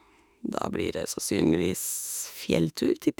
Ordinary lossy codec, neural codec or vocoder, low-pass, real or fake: none; autoencoder, 48 kHz, 32 numbers a frame, DAC-VAE, trained on Japanese speech; none; fake